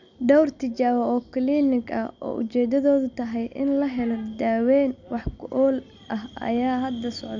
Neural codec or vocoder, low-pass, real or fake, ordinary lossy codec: none; 7.2 kHz; real; none